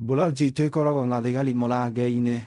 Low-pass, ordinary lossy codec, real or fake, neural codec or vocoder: 10.8 kHz; none; fake; codec, 16 kHz in and 24 kHz out, 0.4 kbps, LongCat-Audio-Codec, fine tuned four codebook decoder